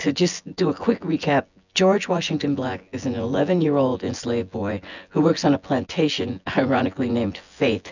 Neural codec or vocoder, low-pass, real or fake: vocoder, 24 kHz, 100 mel bands, Vocos; 7.2 kHz; fake